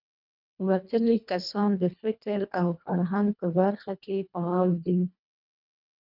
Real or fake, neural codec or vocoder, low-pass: fake; codec, 24 kHz, 1.5 kbps, HILCodec; 5.4 kHz